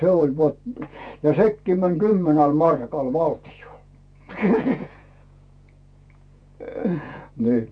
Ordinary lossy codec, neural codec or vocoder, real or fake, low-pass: none; vocoder, 48 kHz, 128 mel bands, Vocos; fake; 9.9 kHz